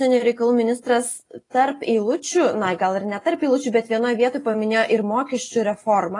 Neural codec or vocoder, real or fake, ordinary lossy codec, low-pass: none; real; AAC, 32 kbps; 10.8 kHz